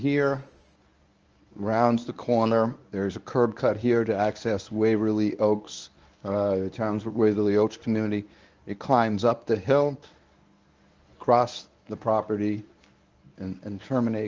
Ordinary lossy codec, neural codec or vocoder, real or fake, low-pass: Opus, 24 kbps; codec, 24 kHz, 0.9 kbps, WavTokenizer, medium speech release version 1; fake; 7.2 kHz